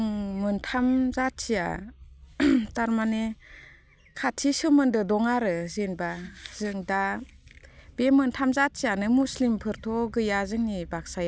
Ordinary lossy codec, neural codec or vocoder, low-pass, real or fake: none; none; none; real